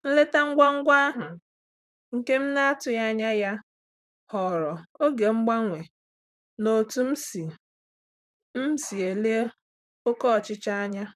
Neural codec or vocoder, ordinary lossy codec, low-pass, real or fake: vocoder, 44.1 kHz, 128 mel bands, Pupu-Vocoder; none; 14.4 kHz; fake